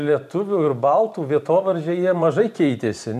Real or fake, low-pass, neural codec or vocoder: fake; 14.4 kHz; vocoder, 44.1 kHz, 128 mel bands every 512 samples, BigVGAN v2